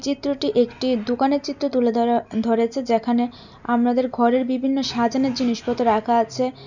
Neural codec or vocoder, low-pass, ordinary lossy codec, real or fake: none; 7.2 kHz; none; real